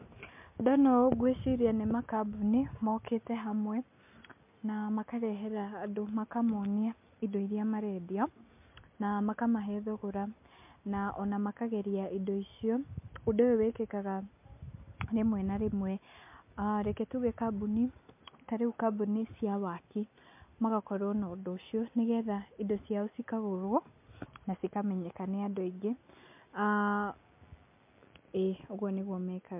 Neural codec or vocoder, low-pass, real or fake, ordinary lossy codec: none; 3.6 kHz; real; MP3, 32 kbps